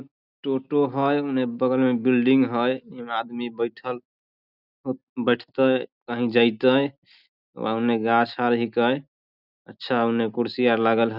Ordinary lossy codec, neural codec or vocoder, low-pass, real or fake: none; none; 5.4 kHz; real